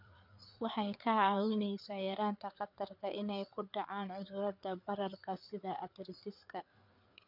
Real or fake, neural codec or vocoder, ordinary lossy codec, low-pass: fake; codec, 16 kHz, 4 kbps, FreqCodec, larger model; none; 5.4 kHz